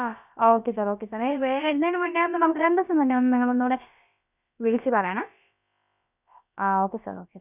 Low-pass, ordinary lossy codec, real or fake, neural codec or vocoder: 3.6 kHz; none; fake; codec, 16 kHz, about 1 kbps, DyCAST, with the encoder's durations